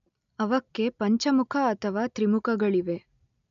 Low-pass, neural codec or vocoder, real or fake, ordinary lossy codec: 7.2 kHz; none; real; none